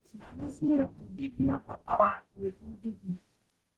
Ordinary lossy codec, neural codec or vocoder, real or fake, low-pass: Opus, 16 kbps; codec, 44.1 kHz, 0.9 kbps, DAC; fake; 19.8 kHz